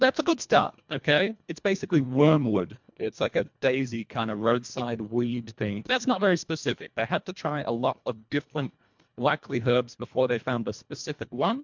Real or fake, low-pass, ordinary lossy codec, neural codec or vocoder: fake; 7.2 kHz; MP3, 64 kbps; codec, 24 kHz, 1.5 kbps, HILCodec